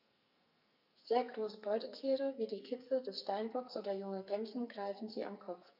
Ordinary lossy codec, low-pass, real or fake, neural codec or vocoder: none; 5.4 kHz; fake; codec, 44.1 kHz, 2.6 kbps, SNAC